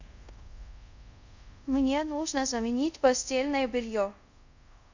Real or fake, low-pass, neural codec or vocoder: fake; 7.2 kHz; codec, 24 kHz, 0.5 kbps, DualCodec